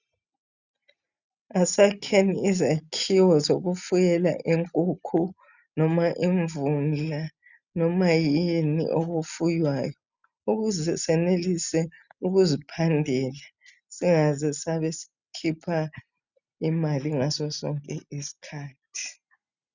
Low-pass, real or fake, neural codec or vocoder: 7.2 kHz; real; none